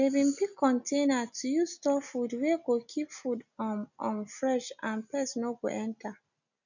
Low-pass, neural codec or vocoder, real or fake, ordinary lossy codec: 7.2 kHz; none; real; none